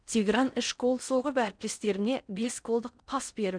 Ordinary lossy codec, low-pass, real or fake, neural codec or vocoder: none; 9.9 kHz; fake; codec, 16 kHz in and 24 kHz out, 0.6 kbps, FocalCodec, streaming, 4096 codes